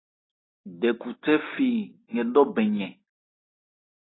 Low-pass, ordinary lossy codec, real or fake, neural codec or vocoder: 7.2 kHz; AAC, 16 kbps; real; none